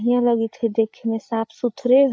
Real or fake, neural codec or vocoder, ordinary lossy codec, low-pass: fake; codec, 16 kHz, 8 kbps, FreqCodec, larger model; none; none